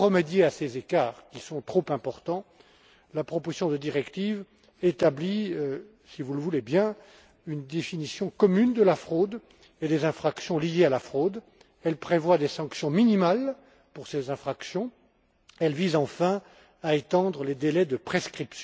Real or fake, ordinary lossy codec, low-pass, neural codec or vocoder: real; none; none; none